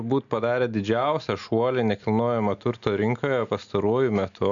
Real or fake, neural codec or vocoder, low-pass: real; none; 7.2 kHz